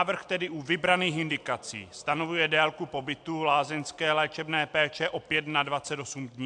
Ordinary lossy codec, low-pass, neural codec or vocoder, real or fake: AAC, 64 kbps; 9.9 kHz; none; real